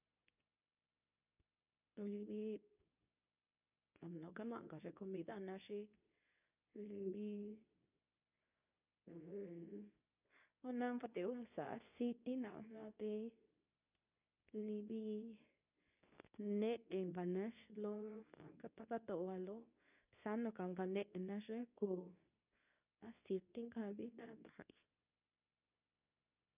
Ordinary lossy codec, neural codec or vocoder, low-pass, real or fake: none; codec, 24 kHz, 0.9 kbps, WavTokenizer, medium speech release version 2; 3.6 kHz; fake